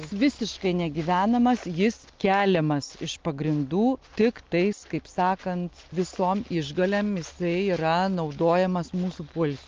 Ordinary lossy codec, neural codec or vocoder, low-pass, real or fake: Opus, 24 kbps; none; 7.2 kHz; real